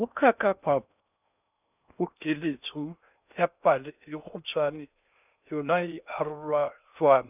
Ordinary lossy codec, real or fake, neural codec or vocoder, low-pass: none; fake; codec, 16 kHz in and 24 kHz out, 0.6 kbps, FocalCodec, streaming, 2048 codes; 3.6 kHz